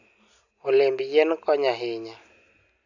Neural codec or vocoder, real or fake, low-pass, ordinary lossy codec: none; real; 7.2 kHz; none